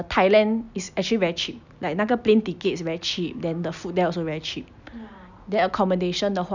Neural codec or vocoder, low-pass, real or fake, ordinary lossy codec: none; 7.2 kHz; real; none